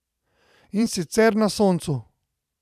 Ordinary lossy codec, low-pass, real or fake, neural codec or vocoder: none; 14.4 kHz; fake; vocoder, 44.1 kHz, 128 mel bands every 512 samples, BigVGAN v2